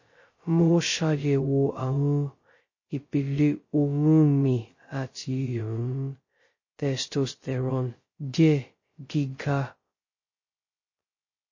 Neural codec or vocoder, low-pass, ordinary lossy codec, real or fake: codec, 16 kHz, 0.2 kbps, FocalCodec; 7.2 kHz; MP3, 32 kbps; fake